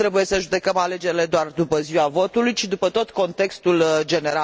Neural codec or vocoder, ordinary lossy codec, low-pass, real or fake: none; none; none; real